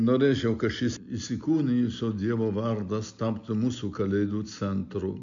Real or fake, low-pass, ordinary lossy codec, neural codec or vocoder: real; 7.2 kHz; MP3, 64 kbps; none